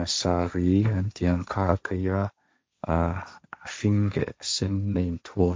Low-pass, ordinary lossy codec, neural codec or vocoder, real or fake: none; none; codec, 16 kHz, 1.1 kbps, Voila-Tokenizer; fake